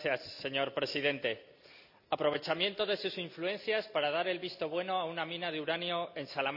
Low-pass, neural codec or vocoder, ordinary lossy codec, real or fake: 5.4 kHz; none; none; real